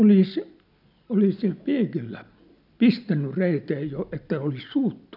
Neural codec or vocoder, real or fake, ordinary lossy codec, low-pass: none; real; none; 5.4 kHz